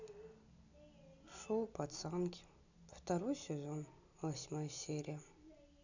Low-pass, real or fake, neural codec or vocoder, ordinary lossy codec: 7.2 kHz; real; none; none